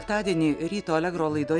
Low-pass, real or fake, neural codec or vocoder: 9.9 kHz; real; none